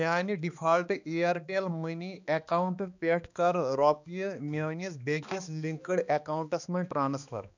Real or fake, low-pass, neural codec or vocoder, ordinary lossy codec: fake; 7.2 kHz; codec, 16 kHz, 2 kbps, X-Codec, HuBERT features, trained on balanced general audio; none